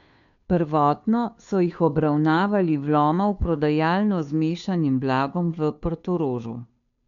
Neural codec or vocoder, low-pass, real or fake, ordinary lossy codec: codec, 16 kHz, 2 kbps, FunCodec, trained on Chinese and English, 25 frames a second; 7.2 kHz; fake; none